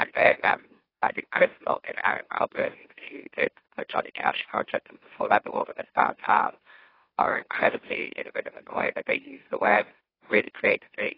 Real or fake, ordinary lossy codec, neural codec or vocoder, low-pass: fake; AAC, 24 kbps; autoencoder, 44.1 kHz, a latent of 192 numbers a frame, MeloTTS; 5.4 kHz